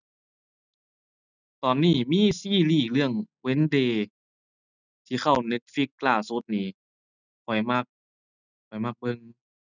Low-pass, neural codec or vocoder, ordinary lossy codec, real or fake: 7.2 kHz; none; none; real